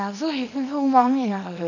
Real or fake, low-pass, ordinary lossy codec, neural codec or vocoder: fake; 7.2 kHz; none; codec, 24 kHz, 0.9 kbps, WavTokenizer, small release